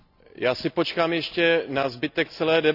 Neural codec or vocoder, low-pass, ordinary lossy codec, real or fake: none; 5.4 kHz; none; real